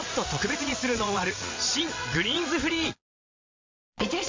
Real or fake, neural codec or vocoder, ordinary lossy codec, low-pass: fake; vocoder, 22.05 kHz, 80 mel bands, Vocos; MP3, 48 kbps; 7.2 kHz